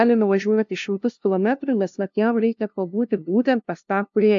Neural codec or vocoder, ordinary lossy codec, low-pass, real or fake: codec, 16 kHz, 0.5 kbps, FunCodec, trained on LibriTTS, 25 frames a second; AAC, 64 kbps; 7.2 kHz; fake